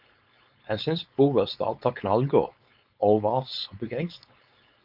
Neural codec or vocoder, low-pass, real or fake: codec, 16 kHz, 4.8 kbps, FACodec; 5.4 kHz; fake